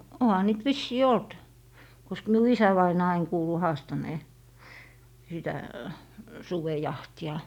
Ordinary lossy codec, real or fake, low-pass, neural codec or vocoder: none; real; 19.8 kHz; none